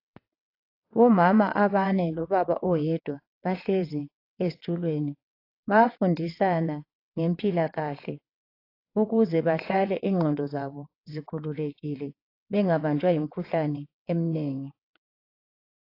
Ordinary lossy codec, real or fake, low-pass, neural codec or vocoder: AAC, 32 kbps; fake; 5.4 kHz; vocoder, 22.05 kHz, 80 mel bands, WaveNeXt